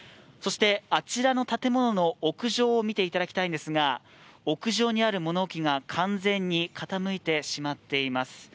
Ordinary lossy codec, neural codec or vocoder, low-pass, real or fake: none; none; none; real